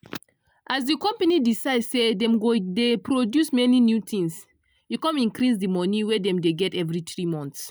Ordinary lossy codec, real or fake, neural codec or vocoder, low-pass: none; real; none; none